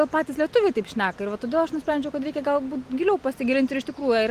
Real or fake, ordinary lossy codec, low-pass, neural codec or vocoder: real; Opus, 24 kbps; 14.4 kHz; none